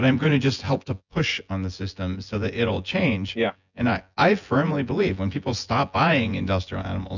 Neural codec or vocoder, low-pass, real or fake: vocoder, 24 kHz, 100 mel bands, Vocos; 7.2 kHz; fake